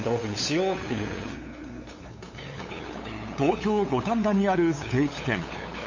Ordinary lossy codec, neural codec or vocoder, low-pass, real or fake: MP3, 32 kbps; codec, 16 kHz, 8 kbps, FunCodec, trained on LibriTTS, 25 frames a second; 7.2 kHz; fake